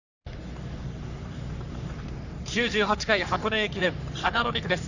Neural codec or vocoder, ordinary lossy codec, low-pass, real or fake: codec, 44.1 kHz, 3.4 kbps, Pupu-Codec; none; 7.2 kHz; fake